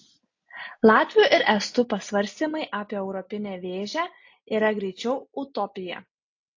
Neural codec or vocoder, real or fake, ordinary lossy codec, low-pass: none; real; AAC, 48 kbps; 7.2 kHz